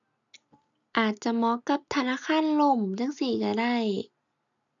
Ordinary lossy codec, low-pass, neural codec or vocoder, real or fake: none; 7.2 kHz; none; real